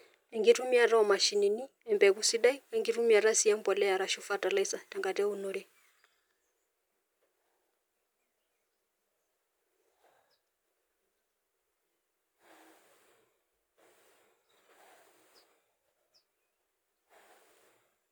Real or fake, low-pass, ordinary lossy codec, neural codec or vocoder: real; none; none; none